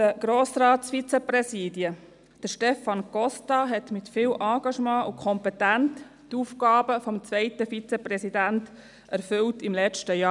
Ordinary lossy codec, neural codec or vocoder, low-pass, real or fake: none; none; 10.8 kHz; real